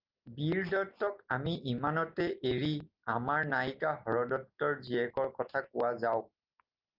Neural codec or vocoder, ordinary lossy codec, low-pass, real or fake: none; Opus, 32 kbps; 5.4 kHz; real